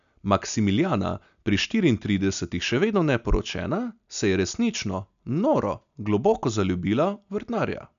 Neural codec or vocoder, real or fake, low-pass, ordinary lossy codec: none; real; 7.2 kHz; none